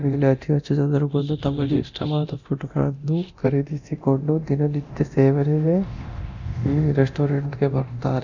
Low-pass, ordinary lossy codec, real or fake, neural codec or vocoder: 7.2 kHz; none; fake; codec, 24 kHz, 0.9 kbps, DualCodec